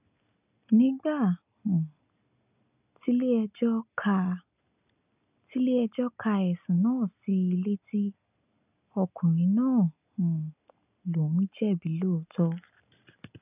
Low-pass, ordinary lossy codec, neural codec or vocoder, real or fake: 3.6 kHz; none; none; real